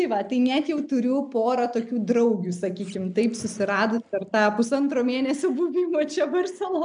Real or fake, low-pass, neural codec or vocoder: real; 9.9 kHz; none